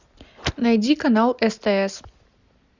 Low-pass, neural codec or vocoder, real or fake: 7.2 kHz; none; real